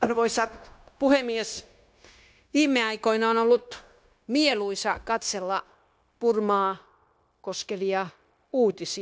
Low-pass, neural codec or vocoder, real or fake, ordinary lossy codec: none; codec, 16 kHz, 0.9 kbps, LongCat-Audio-Codec; fake; none